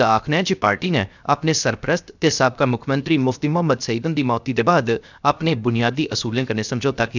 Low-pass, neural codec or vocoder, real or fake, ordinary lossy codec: 7.2 kHz; codec, 16 kHz, 0.7 kbps, FocalCodec; fake; none